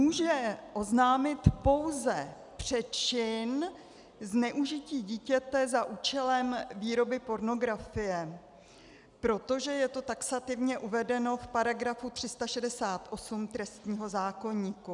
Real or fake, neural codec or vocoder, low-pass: real; none; 10.8 kHz